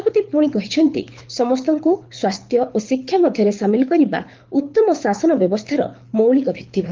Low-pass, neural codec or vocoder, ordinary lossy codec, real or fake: 7.2 kHz; codec, 24 kHz, 6 kbps, HILCodec; Opus, 32 kbps; fake